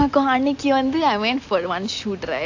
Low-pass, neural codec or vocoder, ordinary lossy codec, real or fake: 7.2 kHz; none; AAC, 48 kbps; real